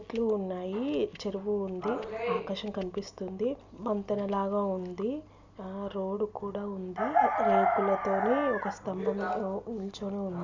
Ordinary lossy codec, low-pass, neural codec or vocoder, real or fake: Opus, 64 kbps; 7.2 kHz; none; real